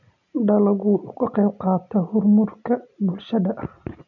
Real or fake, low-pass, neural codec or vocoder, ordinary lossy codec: real; 7.2 kHz; none; none